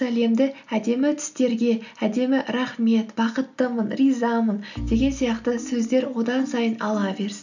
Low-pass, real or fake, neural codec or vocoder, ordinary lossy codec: 7.2 kHz; real; none; none